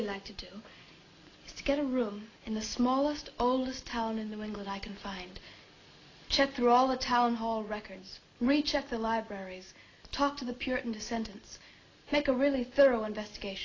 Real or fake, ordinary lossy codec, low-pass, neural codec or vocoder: real; AAC, 32 kbps; 7.2 kHz; none